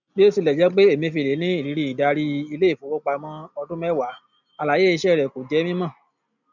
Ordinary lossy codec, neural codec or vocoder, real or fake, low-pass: none; none; real; 7.2 kHz